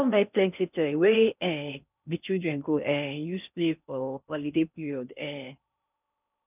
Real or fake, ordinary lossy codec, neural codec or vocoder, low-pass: fake; none; codec, 16 kHz in and 24 kHz out, 0.6 kbps, FocalCodec, streaming, 4096 codes; 3.6 kHz